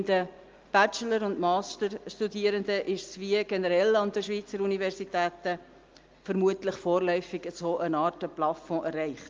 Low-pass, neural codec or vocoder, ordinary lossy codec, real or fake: 7.2 kHz; none; Opus, 24 kbps; real